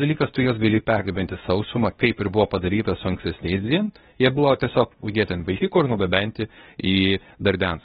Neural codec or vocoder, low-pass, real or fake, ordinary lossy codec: codec, 24 kHz, 0.9 kbps, WavTokenizer, medium speech release version 2; 10.8 kHz; fake; AAC, 16 kbps